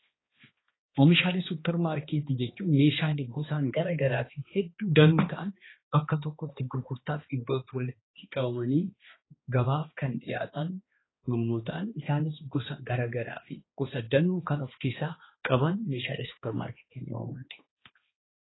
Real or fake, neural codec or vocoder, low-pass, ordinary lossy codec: fake; codec, 16 kHz, 2 kbps, X-Codec, HuBERT features, trained on balanced general audio; 7.2 kHz; AAC, 16 kbps